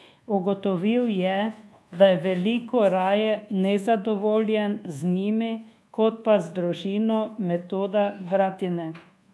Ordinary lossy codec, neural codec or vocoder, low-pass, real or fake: none; codec, 24 kHz, 1.2 kbps, DualCodec; none; fake